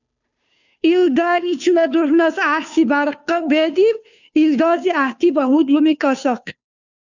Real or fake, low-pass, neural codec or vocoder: fake; 7.2 kHz; codec, 16 kHz, 2 kbps, FunCodec, trained on Chinese and English, 25 frames a second